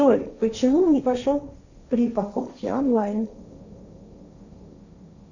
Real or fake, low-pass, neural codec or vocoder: fake; 7.2 kHz; codec, 16 kHz, 1.1 kbps, Voila-Tokenizer